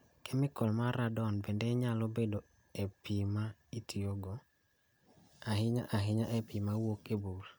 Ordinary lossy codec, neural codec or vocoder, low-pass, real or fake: none; none; none; real